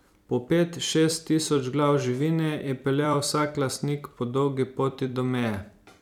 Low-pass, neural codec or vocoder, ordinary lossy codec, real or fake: 19.8 kHz; vocoder, 44.1 kHz, 128 mel bands every 256 samples, BigVGAN v2; none; fake